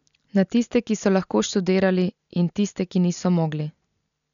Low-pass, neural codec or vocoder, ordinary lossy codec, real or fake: 7.2 kHz; none; none; real